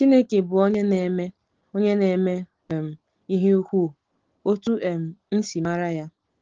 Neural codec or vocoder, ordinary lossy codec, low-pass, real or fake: none; Opus, 16 kbps; 7.2 kHz; real